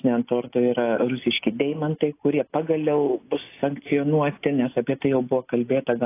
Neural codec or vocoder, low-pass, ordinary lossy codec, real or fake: none; 3.6 kHz; AAC, 24 kbps; real